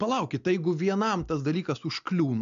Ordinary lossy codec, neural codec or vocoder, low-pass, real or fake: MP3, 64 kbps; none; 7.2 kHz; real